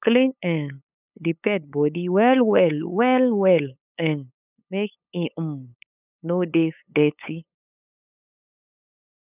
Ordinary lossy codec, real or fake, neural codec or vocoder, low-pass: none; fake; codec, 16 kHz, 8 kbps, FunCodec, trained on LibriTTS, 25 frames a second; 3.6 kHz